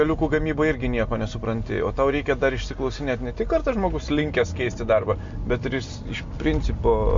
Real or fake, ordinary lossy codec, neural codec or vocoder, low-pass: real; MP3, 48 kbps; none; 7.2 kHz